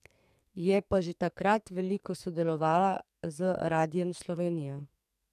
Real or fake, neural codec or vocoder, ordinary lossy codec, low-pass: fake; codec, 44.1 kHz, 2.6 kbps, SNAC; none; 14.4 kHz